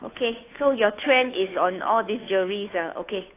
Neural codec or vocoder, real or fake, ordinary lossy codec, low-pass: codec, 16 kHz, 2 kbps, FunCodec, trained on Chinese and English, 25 frames a second; fake; AAC, 24 kbps; 3.6 kHz